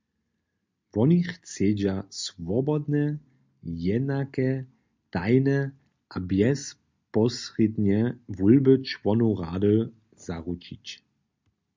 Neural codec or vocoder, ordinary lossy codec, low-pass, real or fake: none; MP3, 64 kbps; 7.2 kHz; real